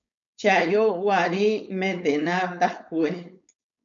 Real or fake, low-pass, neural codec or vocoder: fake; 7.2 kHz; codec, 16 kHz, 4.8 kbps, FACodec